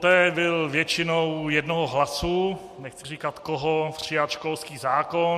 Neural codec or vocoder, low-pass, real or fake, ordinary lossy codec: none; 14.4 kHz; real; MP3, 64 kbps